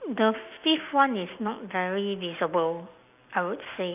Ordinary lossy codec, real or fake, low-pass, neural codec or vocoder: none; real; 3.6 kHz; none